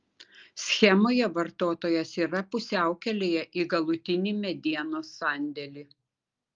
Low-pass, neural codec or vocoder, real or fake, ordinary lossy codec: 7.2 kHz; none; real; Opus, 32 kbps